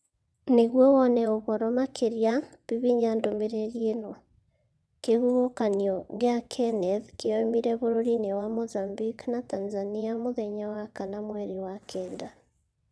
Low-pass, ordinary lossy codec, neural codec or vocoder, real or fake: none; none; vocoder, 22.05 kHz, 80 mel bands, WaveNeXt; fake